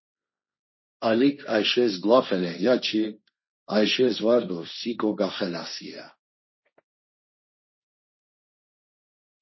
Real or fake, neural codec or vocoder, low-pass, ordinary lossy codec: fake; codec, 16 kHz, 1.1 kbps, Voila-Tokenizer; 7.2 kHz; MP3, 24 kbps